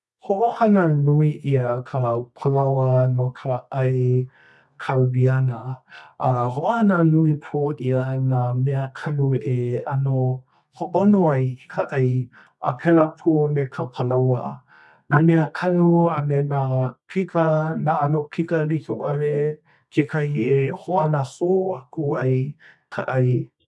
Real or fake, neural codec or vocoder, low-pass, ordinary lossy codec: fake; codec, 24 kHz, 0.9 kbps, WavTokenizer, medium music audio release; none; none